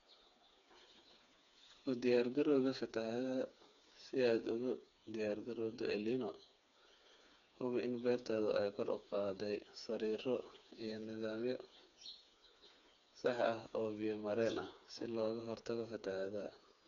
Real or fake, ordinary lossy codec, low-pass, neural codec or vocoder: fake; none; 7.2 kHz; codec, 16 kHz, 4 kbps, FreqCodec, smaller model